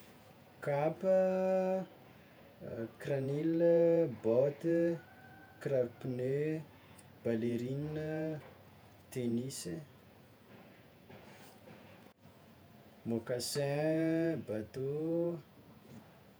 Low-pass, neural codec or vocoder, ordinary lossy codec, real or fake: none; vocoder, 48 kHz, 128 mel bands, Vocos; none; fake